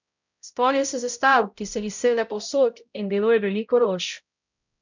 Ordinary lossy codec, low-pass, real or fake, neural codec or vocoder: none; 7.2 kHz; fake; codec, 16 kHz, 0.5 kbps, X-Codec, HuBERT features, trained on balanced general audio